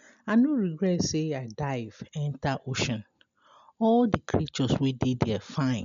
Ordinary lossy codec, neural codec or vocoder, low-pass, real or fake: MP3, 64 kbps; none; 7.2 kHz; real